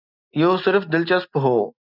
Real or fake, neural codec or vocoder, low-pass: real; none; 5.4 kHz